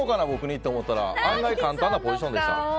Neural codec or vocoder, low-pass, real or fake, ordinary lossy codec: none; none; real; none